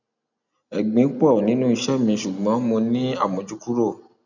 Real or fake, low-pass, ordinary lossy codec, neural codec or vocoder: real; 7.2 kHz; none; none